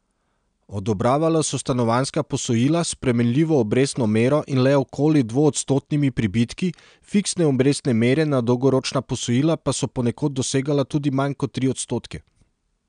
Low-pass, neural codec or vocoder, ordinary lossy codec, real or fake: 9.9 kHz; none; none; real